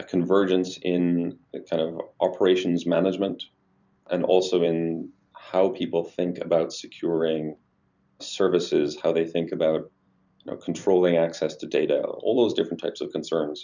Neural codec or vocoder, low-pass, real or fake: vocoder, 44.1 kHz, 128 mel bands every 256 samples, BigVGAN v2; 7.2 kHz; fake